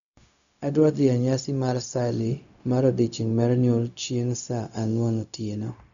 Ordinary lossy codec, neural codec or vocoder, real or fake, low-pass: none; codec, 16 kHz, 0.4 kbps, LongCat-Audio-Codec; fake; 7.2 kHz